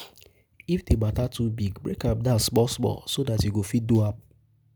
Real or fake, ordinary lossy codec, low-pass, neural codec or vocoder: real; none; none; none